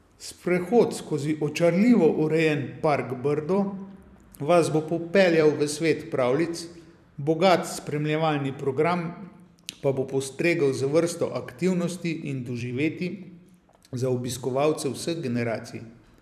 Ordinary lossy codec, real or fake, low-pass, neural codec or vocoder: none; fake; 14.4 kHz; vocoder, 44.1 kHz, 128 mel bands every 256 samples, BigVGAN v2